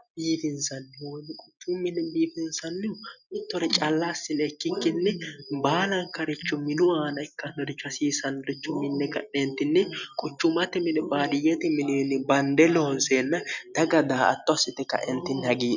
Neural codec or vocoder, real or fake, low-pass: none; real; 7.2 kHz